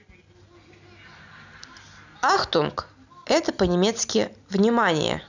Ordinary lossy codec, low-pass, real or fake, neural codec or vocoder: none; 7.2 kHz; real; none